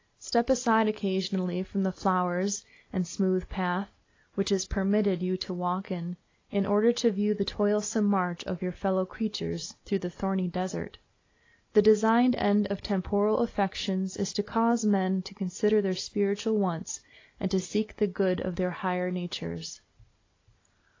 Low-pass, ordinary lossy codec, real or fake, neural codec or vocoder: 7.2 kHz; AAC, 32 kbps; real; none